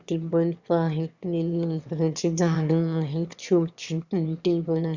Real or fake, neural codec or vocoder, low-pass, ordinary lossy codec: fake; autoencoder, 22.05 kHz, a latent of 192 numbers a frame, VITS, trained on one speaker; 7.2 kHz; Opus, 64 kbps